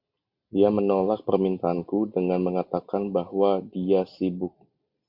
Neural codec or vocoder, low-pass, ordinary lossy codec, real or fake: none; 5.4 kHz; AAC, 48 kbps; real